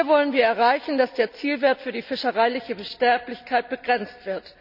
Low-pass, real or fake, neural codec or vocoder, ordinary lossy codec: 5.4 kHz; real; none; none